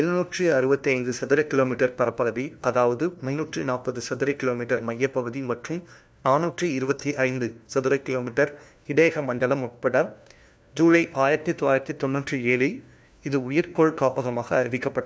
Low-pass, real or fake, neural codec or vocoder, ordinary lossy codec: none; fake; codec, 16 kHz, 1 kbps, FunCodec, trained on LibriTTS, 50 frames a second; none